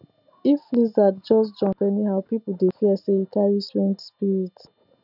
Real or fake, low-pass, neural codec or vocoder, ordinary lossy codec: real; 5.4 kHz; none; none